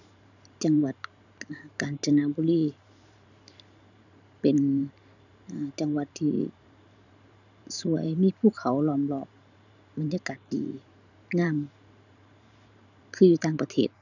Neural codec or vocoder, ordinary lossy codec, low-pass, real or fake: vocoder, 44.1 kHz, 128 mel bands every 512 samples, BigVGAN v2; none; 7.2 kHz; fake